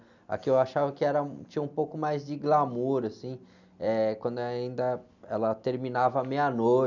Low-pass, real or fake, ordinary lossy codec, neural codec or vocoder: 7.2 kHz; real; none; none